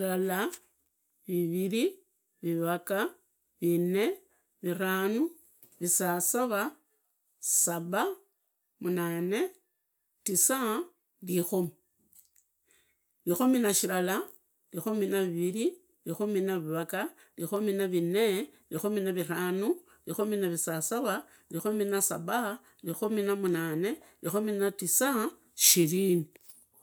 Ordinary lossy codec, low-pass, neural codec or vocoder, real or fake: none; none; none; real